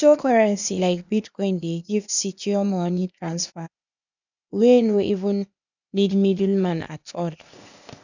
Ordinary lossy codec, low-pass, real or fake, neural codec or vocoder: none; 7.2 kHz; fake; codec, 16 kHz, 0.8 kbps, ZipCodec